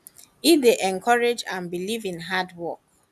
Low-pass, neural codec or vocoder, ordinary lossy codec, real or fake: 14.4 kHz; none; none; real